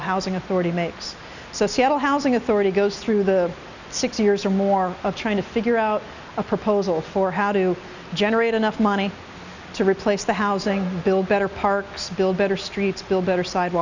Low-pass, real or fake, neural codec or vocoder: 7.2 kHz; real; none